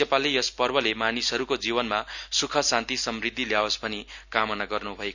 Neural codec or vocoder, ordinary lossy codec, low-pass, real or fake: none; none; 7.2 kHz; real